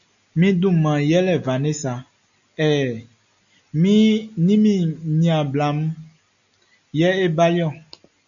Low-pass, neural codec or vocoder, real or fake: 7.2 kHz; none; real